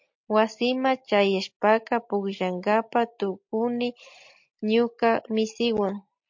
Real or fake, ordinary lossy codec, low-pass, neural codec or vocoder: real; MP3, 48 kbps; 7.2 kHz; none